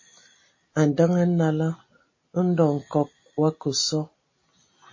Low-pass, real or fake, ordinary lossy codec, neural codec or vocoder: 7.2 kHz; real; MP3, 32 kbps; none